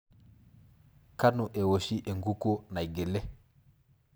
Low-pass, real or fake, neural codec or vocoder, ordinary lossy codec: none; real; none; none